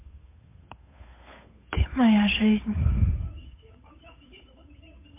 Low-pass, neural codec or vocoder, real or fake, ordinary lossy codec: 3.6 kHz; codec, 16 kHz, 8 kbps, FunCodec, trained on Chinese and English, 25 frames a second; fake; MP3, 24 kbps